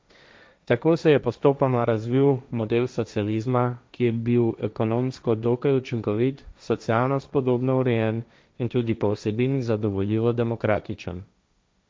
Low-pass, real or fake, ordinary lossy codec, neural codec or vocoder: none; fake; none; codec, 16 kHz, 1.1 kbps, Voila-Tokenizer